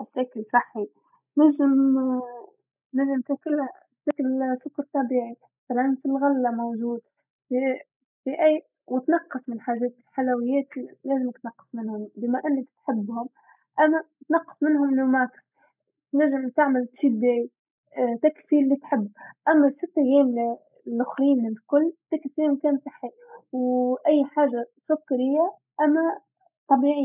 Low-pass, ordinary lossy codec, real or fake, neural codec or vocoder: 3.6 kHz; none; real; none